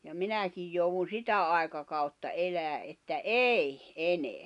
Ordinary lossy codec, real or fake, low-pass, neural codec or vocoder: none; real; 10.8 kHz; none